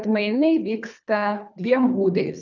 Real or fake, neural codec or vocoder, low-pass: fake; codec, 24 kHz, 3 kbps, HILCodec; 7.2 kHz